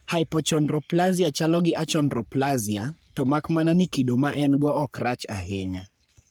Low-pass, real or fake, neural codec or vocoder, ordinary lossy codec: none; fake; codec, 44.1 kHz, 3.4 kbps, Pupu-Codec; none